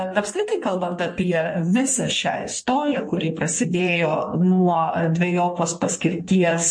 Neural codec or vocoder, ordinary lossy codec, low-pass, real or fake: codec, 16 kHz in and 24 kHz out, 1.1 kbps, FireRedTTS-2 codec; MP3, 48 kbps; 9.9 kHz; fake